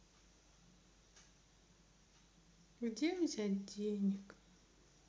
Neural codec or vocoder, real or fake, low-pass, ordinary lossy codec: none; real; none; none